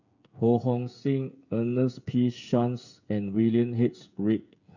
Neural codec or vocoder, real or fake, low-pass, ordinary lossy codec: codec, 16 kHz, 8 kbps, FreqCodec, smaller model; fake; 7.2 kHz; none